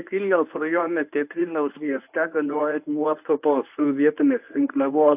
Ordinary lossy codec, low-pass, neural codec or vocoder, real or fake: AAC, 32 kbps; 3.6 kHz; codec, 24 kHz, 0.9 kbps, WavTokenizer, medium speech release version 1; fake